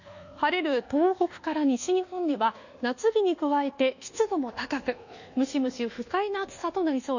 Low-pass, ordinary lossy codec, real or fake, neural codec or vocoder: 7.2 kHz; none; fake; codec, 24 kHz, 1.2 kbps, DualCodec